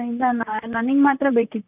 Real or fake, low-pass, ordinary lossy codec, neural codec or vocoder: fake; 3.6 kHz; none; vocoder, 44.1 kHz, 128 mel bands, Pupu-Vocoder